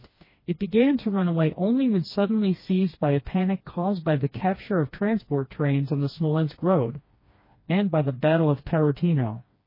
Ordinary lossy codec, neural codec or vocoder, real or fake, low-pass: MP3, 24 kbps; codec, 16 kHz, 2 kbps, FreqCodec, smaller model; fake; 5.4 kHz